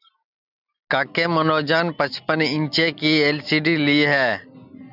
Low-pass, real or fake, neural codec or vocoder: 5.4 kHz; real; none